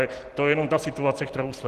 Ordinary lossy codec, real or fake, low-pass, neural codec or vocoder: Opus, 16 kbps; real; 14.4 kHz; none